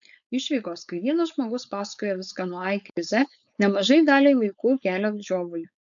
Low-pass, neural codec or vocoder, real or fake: 7.2 kHz; codec, 16 kHz, 4.8 kbps, FACodec; fake